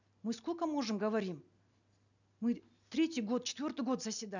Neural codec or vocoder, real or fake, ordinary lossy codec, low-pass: none; real; none; 7.2 kHz